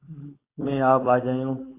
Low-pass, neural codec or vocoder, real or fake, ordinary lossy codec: 3.6 kHz; codec, 16 kHz, 4.8 kbps, FACodec; fake; AAC, 24 kbps